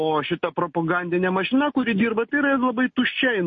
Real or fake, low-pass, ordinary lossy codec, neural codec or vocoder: real; 7.2 kHz; MP3, 32 kbps; none